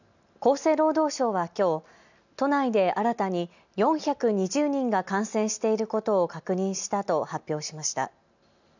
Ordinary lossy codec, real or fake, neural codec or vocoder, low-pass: none; real; none; 7.2 kHz